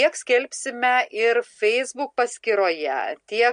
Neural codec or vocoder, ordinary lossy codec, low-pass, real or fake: none; MP3, 48 kbps; 9.9 kHz; real